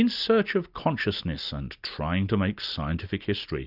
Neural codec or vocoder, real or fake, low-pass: none; real; 5.4 kHz